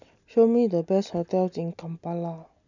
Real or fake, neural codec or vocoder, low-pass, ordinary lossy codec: real; none; 7.2 kHz; none